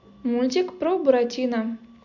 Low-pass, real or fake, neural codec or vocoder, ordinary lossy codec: 7.2 kHz; real; none; none